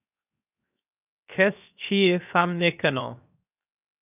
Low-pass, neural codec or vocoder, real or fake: 3.6 kHz; codec, 16 kHz, 0.7 kbps, FocalCodec; fake